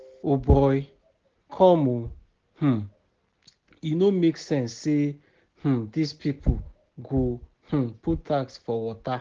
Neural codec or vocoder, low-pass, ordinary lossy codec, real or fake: none; 7.2 kHz; Opus, 16 kbps; real